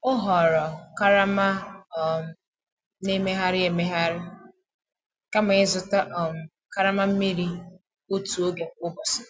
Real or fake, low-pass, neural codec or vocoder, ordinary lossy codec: real; none; none; none